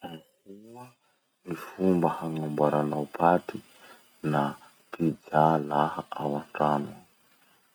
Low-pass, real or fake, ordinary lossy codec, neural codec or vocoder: none; real; none; none